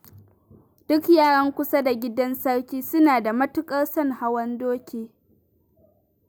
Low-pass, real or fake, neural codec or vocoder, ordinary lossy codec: none; real; none; none